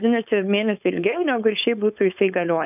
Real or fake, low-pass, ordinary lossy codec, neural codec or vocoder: fake; 3.6 kHz; AAC, 32 kbps; codec, 16 kHz, 4.8 kbps, FACodec